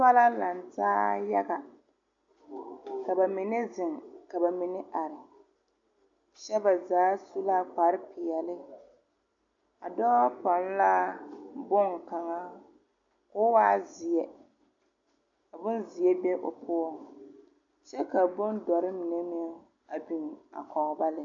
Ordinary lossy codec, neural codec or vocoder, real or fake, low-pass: AAC, 64 kbps; none; real; 7.2 kHz